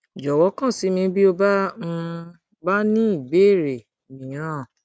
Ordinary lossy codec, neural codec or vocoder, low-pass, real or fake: none; none; none; real